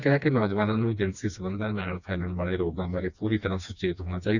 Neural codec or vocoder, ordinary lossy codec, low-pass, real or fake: codec, 16 kHz, 2 kbps, FreqCodec, smaller model; none; 7.2 kHz; fake